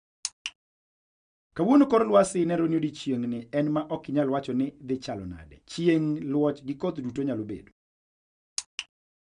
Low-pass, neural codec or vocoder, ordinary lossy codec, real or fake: 9.9 kHz; none; none; real